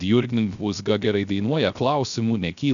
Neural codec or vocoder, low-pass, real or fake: codec, 16 kHz, 0.3 kbps, FocalCodec; 7.2 kHz; fake